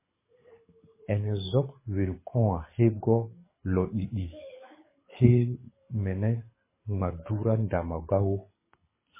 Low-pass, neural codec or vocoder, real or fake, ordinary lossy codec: 3.6 kHz; codec, 24 kHz, 6 kbps, HILCodec; fake; MP3, 16 kbps